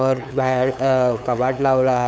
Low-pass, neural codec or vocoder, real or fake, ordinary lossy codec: none; codec, 16 kHz, 8 kbps, FunCodec, trained on LibriTTS, 25 frames a second; fake; none